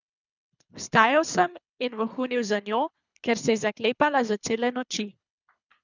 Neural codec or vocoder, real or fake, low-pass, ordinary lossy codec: codec, 24 kHz, 3 kbps, HILCodec; fake; 7.2 kHz; none